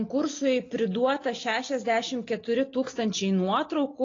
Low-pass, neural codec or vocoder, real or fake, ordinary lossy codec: 7.2 kHz; none; real; AAC, 32 kbps